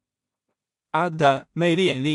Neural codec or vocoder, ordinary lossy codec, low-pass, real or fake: codec, 16 kHz in and 24 kHz out, 0.4 kbps, LongCat-Audio-Codec, two codebook decoder; AAC, 64 kbps; 10.8 kHz; fake